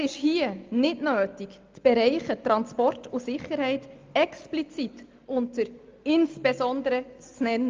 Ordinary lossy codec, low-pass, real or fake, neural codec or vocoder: Opus, 24 kbps; 7.2 kHz; real; none